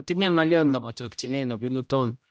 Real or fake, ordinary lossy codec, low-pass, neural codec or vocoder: fake; none; none; codec, 16 kHz, 0.5 kbps, X-Codec, HuBERT features, trained on general audio